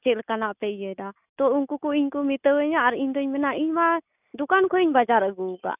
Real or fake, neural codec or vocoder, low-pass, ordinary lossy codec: real; none; 3.6 kHz; none